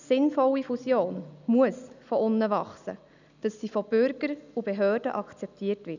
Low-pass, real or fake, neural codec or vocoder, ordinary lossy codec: 7.2 kHz; real; none; none